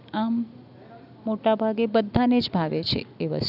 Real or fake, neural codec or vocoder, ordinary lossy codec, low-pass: real; none; none; 5.4 kHz